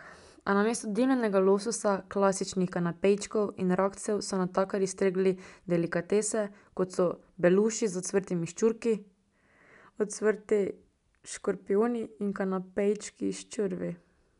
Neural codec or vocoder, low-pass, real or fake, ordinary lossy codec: none; 10.8 kHz; real; none